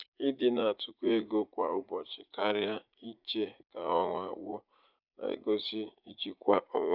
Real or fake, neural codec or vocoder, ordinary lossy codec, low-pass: fake; vocoder, 44.1 kHz, 80 mel bands, Vocos; none; 5.4 kHz